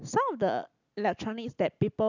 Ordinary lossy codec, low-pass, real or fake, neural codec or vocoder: none; 7.2 kHz; real; none